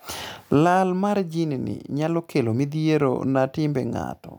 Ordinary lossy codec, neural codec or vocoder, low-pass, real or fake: none; none; none; real